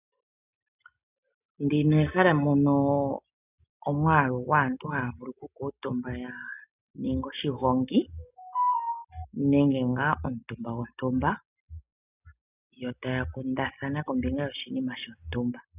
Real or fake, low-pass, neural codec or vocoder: real; 3.6 kHz; none